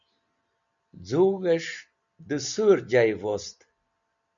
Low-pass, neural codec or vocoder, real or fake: 7.2 kHz; none; real